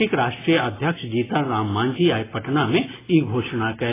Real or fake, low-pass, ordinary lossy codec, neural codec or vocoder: real; 3.6 kHz; AAC, 16 kbps; none